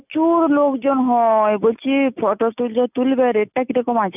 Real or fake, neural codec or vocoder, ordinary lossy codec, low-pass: real; none; none; 3.6 kHz